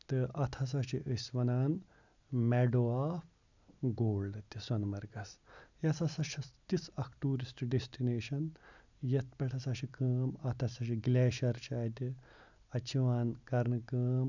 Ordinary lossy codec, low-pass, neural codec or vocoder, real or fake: none; 7.2 kHz; none; real